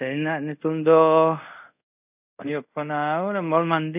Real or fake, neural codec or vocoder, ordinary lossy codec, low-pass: fake; codec, 24 kHz, 0.5 kbps, DualCodec; none; 3.6 kHz